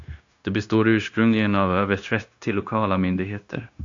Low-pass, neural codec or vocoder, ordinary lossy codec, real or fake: 7.2 kHz; codec, 16 kHz, 0.9 kbps, LongCat-Audio-Codec; AAC, 48 kbps; fake